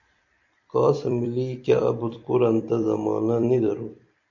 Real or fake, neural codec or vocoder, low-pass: fake; vocoder, 44.1 kHz, 128 mel bands every 256 samples, BigVGAN v2; 7.2 kHz